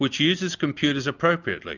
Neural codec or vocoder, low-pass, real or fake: none; 7.2 kHz; real